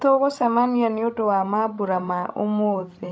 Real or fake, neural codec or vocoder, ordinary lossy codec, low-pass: fake; codec, 16 kHz, 16 kbps, FreqCodec, larger model; none; none